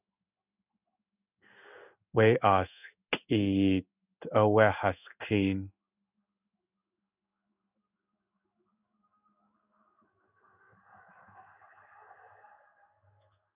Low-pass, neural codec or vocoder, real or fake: 3.6 kHz; codec, 16 kHz in and 24 kHz out, 1 kbps, XY-Tokenizer; fake